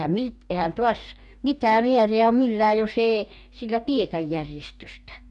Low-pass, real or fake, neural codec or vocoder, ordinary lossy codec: 10.8 kHz; fake; codec, 44.1 kHz, 2.6 kbps, DAC; none